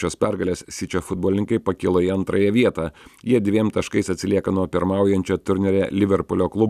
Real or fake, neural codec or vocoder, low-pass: real; none; 14.4 kHz